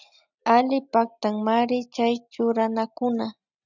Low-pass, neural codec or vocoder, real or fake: 7.2 kHz; none; real